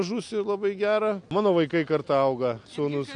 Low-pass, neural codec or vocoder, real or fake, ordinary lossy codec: 9.9 kHz; none; real; AAC, 64 kbps